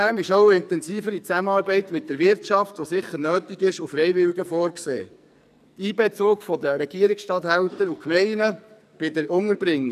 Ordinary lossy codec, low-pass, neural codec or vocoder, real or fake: none; 14.4 kHz; codec, 44.1 kHz, 2.6 kbps, SNAC; fake